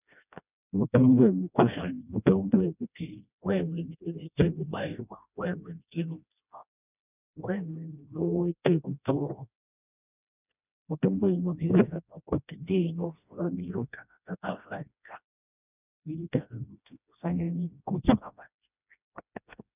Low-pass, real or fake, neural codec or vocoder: 3.6 kHz; fake; codec, 16 kHz, 1 kbps, FreqCodec, smaller model